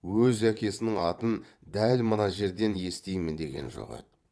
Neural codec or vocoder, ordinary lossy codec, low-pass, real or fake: vocoder, 22.05 kHz, 80 mel bands, WaveNeXt; none; none; fake